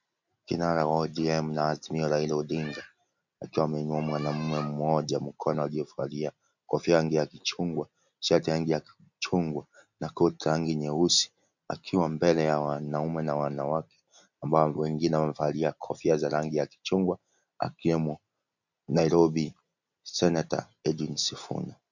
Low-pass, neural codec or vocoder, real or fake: 7.2 kHz; none; real